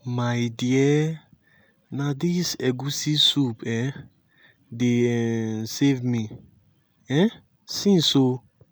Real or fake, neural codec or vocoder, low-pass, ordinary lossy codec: real; none; none; none